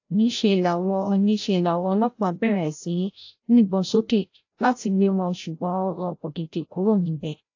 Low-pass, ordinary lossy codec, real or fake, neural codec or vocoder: 7.2 kHz; AAC, 48 kbps; fake; codec, 16 kHz, 0.5 kbps, FreqCodec, larger model